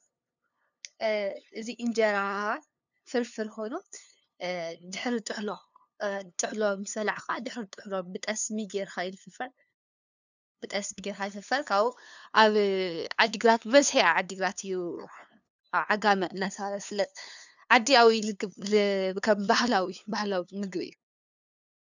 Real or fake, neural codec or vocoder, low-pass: fake; codec, 16 kHz, 2 kbps, FunCodec, trained on LibriTTS, 25 frames a second; 7.2 kHz